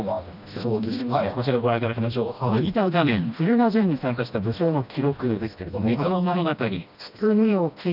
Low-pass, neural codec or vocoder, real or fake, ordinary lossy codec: 5.4 kHz; codec, 16 kHz, 1 kbps, FreqCodec, smaller model; fake; none